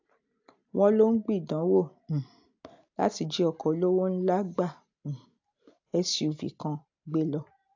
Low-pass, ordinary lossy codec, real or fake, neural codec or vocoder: 7.2 kHz; AAC, 48 kbps; real; none